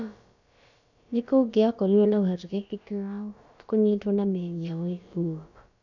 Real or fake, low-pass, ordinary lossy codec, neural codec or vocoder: fake; 7.2 kHz; none; codec, 16 kHz, about 1 kbps, DyCAST, with the encoder's durations